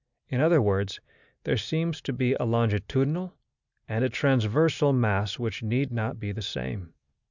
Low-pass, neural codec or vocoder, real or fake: 7.2 kHz; none; real